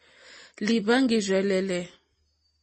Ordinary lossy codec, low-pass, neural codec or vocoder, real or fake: MP3, 32 kbps; 10.8 kHz; none; real